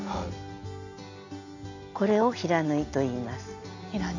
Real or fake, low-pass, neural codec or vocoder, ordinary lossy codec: real; 7.2 kHz; none; none